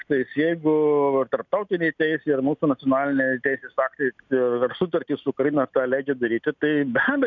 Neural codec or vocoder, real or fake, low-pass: none; real; 7.2 kHz